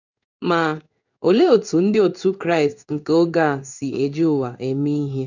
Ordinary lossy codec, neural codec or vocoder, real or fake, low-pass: none; codec, 16 kHz in and 24 kHz out, 1 kbps, XY-Tokenizer; fake; 7.2 kHz